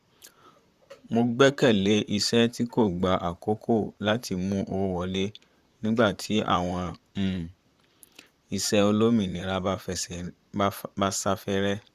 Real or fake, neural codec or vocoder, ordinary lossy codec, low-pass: fake; vocoder, 44.1 kHz, 128 mel bands, Pupu-Vocoder; Opus, 64 kbps; 14.4 kHz